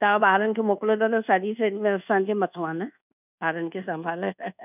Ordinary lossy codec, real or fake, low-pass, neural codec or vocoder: none; fake; 3.6 kHz; codec, 24 kHz, 1.2 kbps, DualCodec